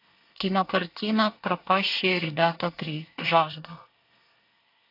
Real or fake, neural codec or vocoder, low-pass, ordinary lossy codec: fake; codec, 24 kHz, 1 kbps, SNAC; 5.4 kHz; AAC, 32 kbps